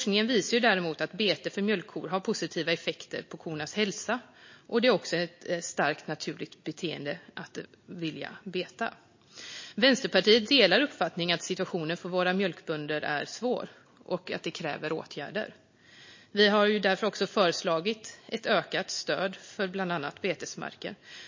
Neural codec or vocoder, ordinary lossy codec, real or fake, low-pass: none; MP3, 32 kbps; real; 7.2 kHz